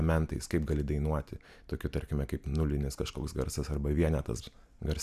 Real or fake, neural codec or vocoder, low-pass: real; none; 14.4 kHz